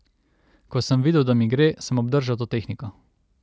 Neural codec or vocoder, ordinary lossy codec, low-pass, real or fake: none; none; none; real